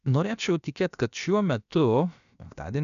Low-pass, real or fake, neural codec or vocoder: 7.2 kHz; fake; codec, 16 kHz, about 1 kbps, DyCAST, with the encoder's durations